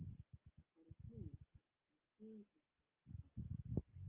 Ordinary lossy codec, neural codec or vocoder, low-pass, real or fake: Opus, 24 kbps; none; 3.6 kHz; real